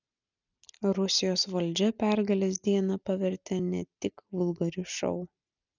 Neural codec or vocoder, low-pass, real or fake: none; 7.2 kHz; real